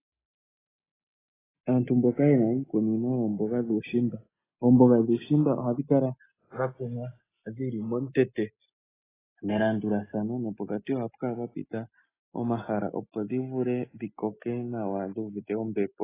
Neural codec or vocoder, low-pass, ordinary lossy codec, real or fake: none; 3.6 kHz; AAC, 16 kbps; real